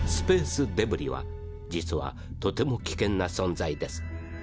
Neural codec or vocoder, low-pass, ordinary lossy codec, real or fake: none; none; none; real